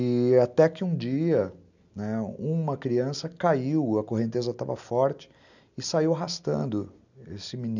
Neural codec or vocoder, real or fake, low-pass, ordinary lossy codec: none; real; 7.2 kHz; none